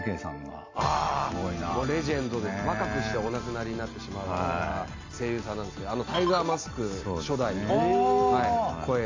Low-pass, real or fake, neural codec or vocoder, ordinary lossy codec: 7.2 kHz; real; none; AAC, 48 kbps